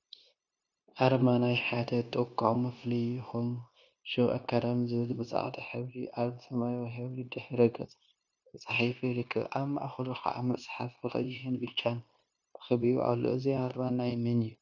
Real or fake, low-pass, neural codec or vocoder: fake; 7.2 kHz; codec, 16 kHz, 0.9 kbps, LongCat-Audio-Codec